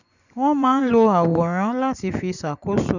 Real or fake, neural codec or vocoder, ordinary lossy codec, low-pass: fake; vocoder, 24 kHz, 100 mel bands, Vocos; none; 7.2 kHz